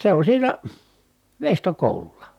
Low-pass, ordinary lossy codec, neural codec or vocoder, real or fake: 19.8 kHz; none; vocoder, 44.1 kHz, 128 mel bands, Pupu-Vocoder; fake